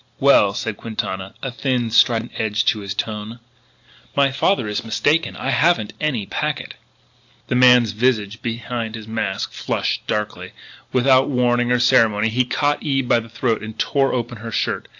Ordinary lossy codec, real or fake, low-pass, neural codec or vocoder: AAC, 48 kbps; real; 7.2 kHz; none